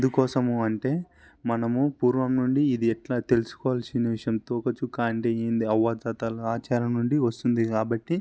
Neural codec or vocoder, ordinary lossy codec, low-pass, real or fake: none; none; none; real